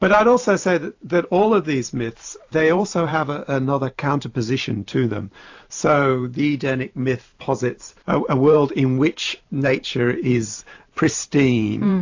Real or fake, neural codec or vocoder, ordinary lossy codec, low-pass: fake; vocoder, 44.1 kHz, 128 mel bands every 512 samples, BigVGAN v2; AAC, 48 kbps; 7.2 kHz